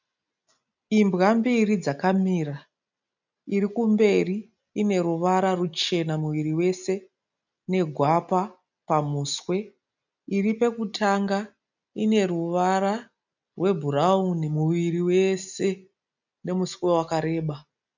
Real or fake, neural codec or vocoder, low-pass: real; none; 7.2 kHz